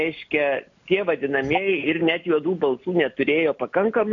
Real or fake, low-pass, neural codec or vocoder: real; 7.2 kHz; none